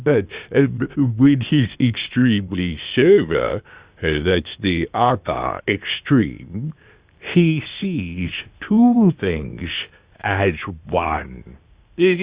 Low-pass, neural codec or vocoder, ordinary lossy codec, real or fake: 3.6 kHz; codec, 16 kHz, 0.8 kbps, ZipCodec; Opus, 64 kbps; fake